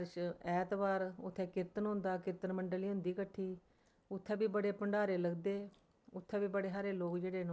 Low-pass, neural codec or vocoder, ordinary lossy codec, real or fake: none; none; none; real